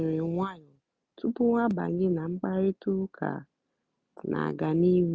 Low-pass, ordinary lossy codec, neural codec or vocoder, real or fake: none; none; none; real